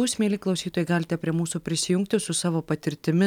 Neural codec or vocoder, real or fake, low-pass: none; real; 19.8 kHz